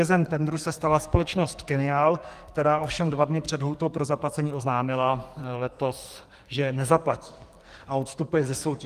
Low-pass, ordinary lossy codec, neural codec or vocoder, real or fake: 14.4 kHz; Opus, 32 kbps; codec, 44.1 kHz, 2.6 kbps, SNAC; fake